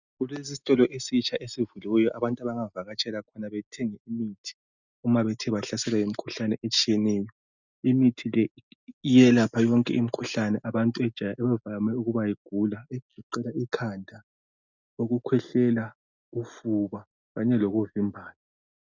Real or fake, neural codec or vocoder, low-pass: real; none; 7.2 kHz